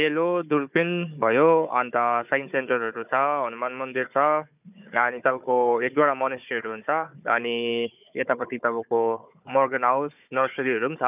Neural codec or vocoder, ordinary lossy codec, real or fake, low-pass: codec, 16 kHz, 4 kbps, FunCodec, trained on Chinese and English, 50 frames a second; none; fake; 3.6 kHz